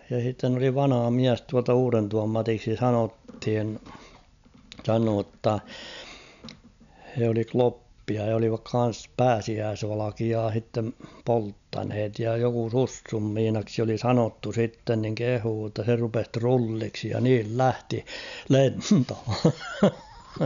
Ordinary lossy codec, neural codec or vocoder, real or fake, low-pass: none; none; real; 7.2 kHz